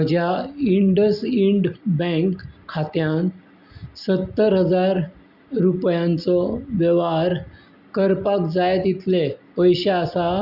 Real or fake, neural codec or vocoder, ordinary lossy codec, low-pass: real; none; Opus, 64 kbps; 5.4 kHz